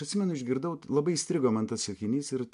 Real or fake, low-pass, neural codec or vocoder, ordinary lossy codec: real; 10.8 kHz; none; MP3, 64 kbps